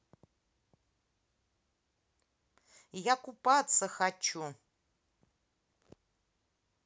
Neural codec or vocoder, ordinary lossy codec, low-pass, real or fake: none; none; none; real